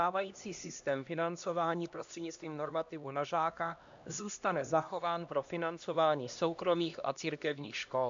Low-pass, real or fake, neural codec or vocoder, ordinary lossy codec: 7.2 kHz; fake; codec, 16 kHz, 1 kbps, X-Codec, HuBERT features, trained on LibriSpeech; MP3, 64 kbps